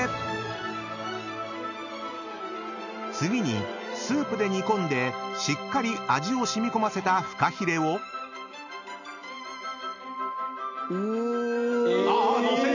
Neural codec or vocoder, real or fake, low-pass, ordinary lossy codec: none; real; 7.2 kHz; none